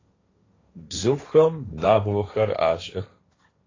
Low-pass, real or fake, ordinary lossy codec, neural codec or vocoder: 7.2 kHz; fake; AAC, 32 kbps; codec, 16 kHz, 1.1 kbps, Voila-Tokenizer